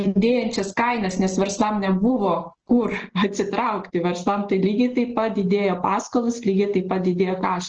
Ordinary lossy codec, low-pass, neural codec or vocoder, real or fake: Opus, 16 kbps; 7.2 kHz; none; real